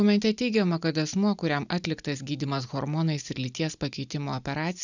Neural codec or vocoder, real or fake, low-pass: none; real; 7.2 kHz